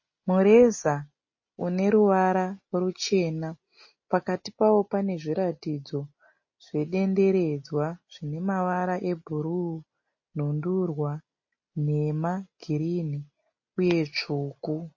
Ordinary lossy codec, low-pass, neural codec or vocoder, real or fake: MP3, 32 kbps; 7.2 kHz; none; real